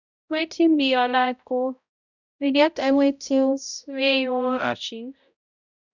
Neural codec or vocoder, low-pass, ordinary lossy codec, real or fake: codec, 16 kHz, 0.5 kbps, X-Codec, HuBERT features, trained on balanced general audio; 7.2 kHz; none; fake